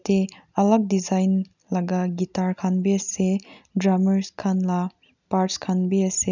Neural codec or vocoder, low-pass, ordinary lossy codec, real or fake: none; 7.2 kHz; none; real